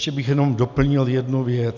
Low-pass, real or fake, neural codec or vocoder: 7.2 kHz; real; none